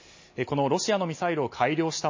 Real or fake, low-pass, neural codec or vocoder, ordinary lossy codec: real; 7.2 kHz; none; MP3, 32 kbps